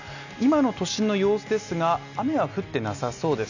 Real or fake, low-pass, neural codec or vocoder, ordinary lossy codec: real; 7.2 kHz; none; none